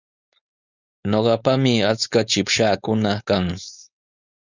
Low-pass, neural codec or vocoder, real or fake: 7.2 kHz; codec, 16 kHz, 4.8 kbps, FACodec; fake